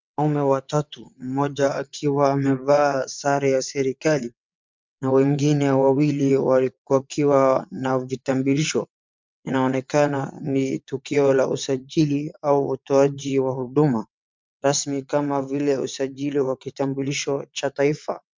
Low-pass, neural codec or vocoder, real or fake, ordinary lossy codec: 7.2 kHz; vocoder, 22.05 kHz, 80 mel bands, WaveNeXt; fake; MP3, 64 kbps